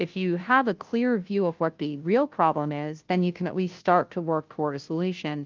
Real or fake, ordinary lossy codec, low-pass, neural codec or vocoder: fake; Opus, 24 kbps; 7.2 kHz; codec, 16 kHz, 0.5 kbps, FunCodec, trained on Chinese and English, 25 frames a second